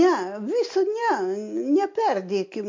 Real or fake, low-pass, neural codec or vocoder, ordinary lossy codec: real; 7.2 kHz; none; MP3, 48 kbps